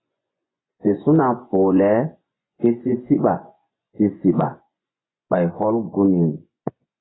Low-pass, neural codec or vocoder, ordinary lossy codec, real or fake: 7.2 kHz; vocoder, 24 kHz, 100 mel bands, Vocos; AAC, 16 kbps; fake